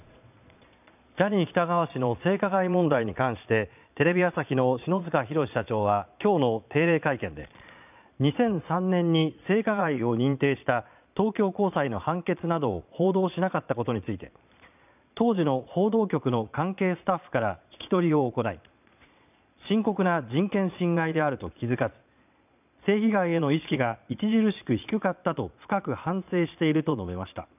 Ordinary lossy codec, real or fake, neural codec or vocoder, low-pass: none; fake; vocoder, 22.05 kHz, 80 mel bands, Vocos; 3.6 kHz